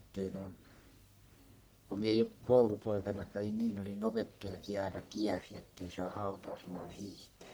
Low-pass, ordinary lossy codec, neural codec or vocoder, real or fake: none; none; codec, 44.1 kHz, 1.7 kbps, Pupu-Codec; fake